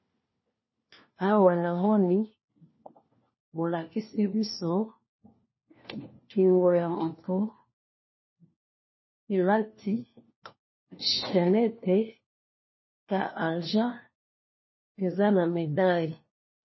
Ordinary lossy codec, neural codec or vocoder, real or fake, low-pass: MP3, 24 kbps; codec, 16 kHz, 1 kbps, FunCodec, trained on LibriTTS, 50 frames a second; fake; 7.2 kHz